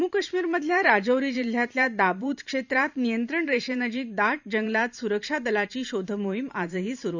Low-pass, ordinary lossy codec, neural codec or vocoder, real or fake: 7.2 kHz; none; vocoder, 44.1 kHz, 128 mel bands every 512 samples, BigVGAN v2; fake